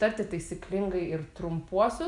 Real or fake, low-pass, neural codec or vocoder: real; 10.8 kHz; none